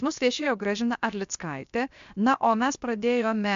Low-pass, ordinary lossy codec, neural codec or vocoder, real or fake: 7.2 kHz; MP3, 64 kbps; codec, 16 kHz, 0.7 kbps, FocalCodec; fake